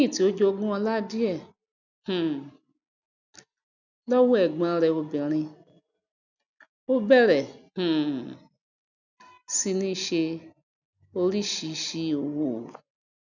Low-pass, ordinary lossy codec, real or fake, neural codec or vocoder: 7.2 kHz; none; real; none